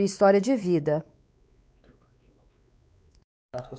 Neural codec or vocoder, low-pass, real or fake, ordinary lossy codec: codec, 16 kHz, 4 kbps, X-Codec, WavLM features, trained on Multilingual LibriSpeech; none; fake; none